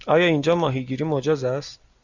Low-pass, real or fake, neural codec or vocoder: 7.2 kHz; real; none